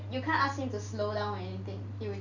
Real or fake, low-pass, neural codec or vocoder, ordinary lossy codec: real; 7.2 kHz; none; none